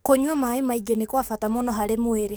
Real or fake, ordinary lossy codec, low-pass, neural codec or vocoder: fake; none; none; codec, 44.1 kHz, 7.8 kbps, DAC